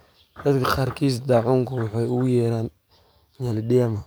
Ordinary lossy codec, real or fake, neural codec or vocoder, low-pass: none; fake; codec, 44.1 kHz, 7.8 kbps, DAC; none